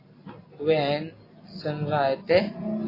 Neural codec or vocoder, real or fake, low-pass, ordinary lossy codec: none; real; 5.4 kHz; AAC, 24 kbps